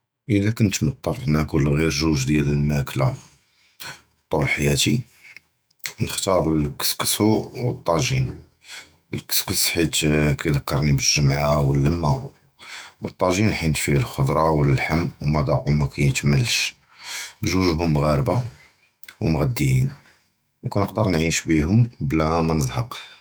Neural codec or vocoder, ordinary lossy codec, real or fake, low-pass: autoencoder, 48 kHz, 128 numbers a frame, DAC-VAE, trained on Japanese speech; none; fake; none